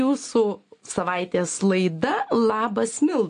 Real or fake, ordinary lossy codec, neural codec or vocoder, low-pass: real; AAC, 48 kbps; none; 9.9 kHz